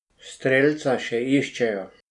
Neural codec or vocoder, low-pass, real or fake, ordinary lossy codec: none; 10.8 kHz; real; none